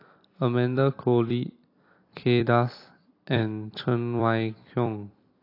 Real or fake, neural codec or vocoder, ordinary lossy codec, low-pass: fake; vocoder, 44.1 kHz, 128 mel bands every 256 samples, BigVGAN v2; AAC, 32 kbps; 5.4 kHz